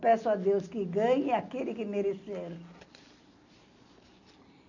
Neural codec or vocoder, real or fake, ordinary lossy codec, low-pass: none; real; none; 7.2 kHz